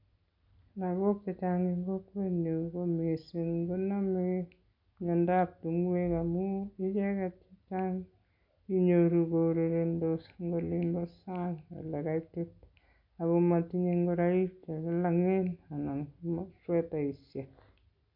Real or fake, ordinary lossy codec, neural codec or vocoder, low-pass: real; MP3, 48 kbps; none; 5.4 kHz